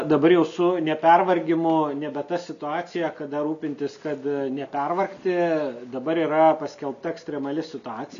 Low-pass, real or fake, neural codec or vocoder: 7.2 kHz; real; none